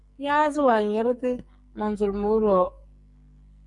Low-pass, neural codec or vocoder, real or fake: 10.8 kHz; codec, 44.1 kHz, 2.6 kbps, SNAC; fake